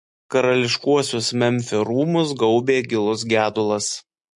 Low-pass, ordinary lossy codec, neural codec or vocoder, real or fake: 10.8 kHz; MP3, 48 kbps; none; real